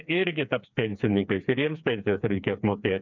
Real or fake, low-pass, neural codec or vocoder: fake; 7.2 kHz; codec, 16 kHz, 4 kbps, FreqCodec, smaller model